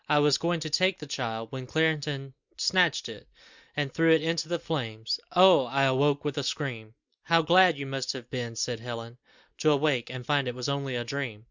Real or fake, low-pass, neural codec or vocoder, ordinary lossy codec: real; 7.2 kHz; none; Opus, 64 kbps